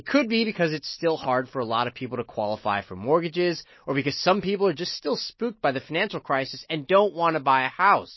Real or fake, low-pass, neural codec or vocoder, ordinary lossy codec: real; 7.2 kHz; none; MP3, 24 kbps